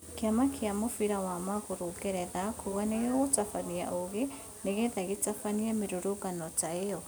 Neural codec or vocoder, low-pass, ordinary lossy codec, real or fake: none; none; none; real